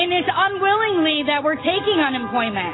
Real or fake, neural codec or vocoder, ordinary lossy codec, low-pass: real; none; AAC, 16 kbps; 7.2 kHz